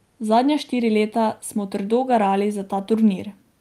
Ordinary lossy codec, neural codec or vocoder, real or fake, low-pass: Opus, 32 kbps; none; real; 14.4 kHz